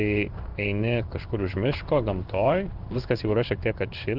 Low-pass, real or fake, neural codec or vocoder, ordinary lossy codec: 5.4 kHz; fake; codec, 16 kHz in and 24 kHz out, 1 kbps, XY-Tokenizer; Opus, 32 kbps